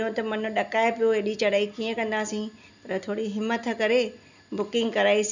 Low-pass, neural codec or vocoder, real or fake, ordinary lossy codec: 7.2 kHz; none; real; none